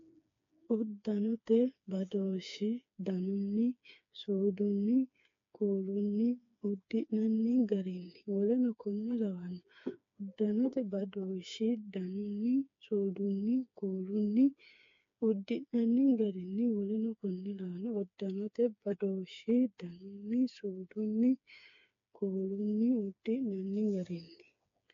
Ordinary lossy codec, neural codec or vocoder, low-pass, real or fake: AAC, 48 kbps; codec, 16 kHz, 4 kbps, FreqCodec, smaller model; 7.2 kHz; fake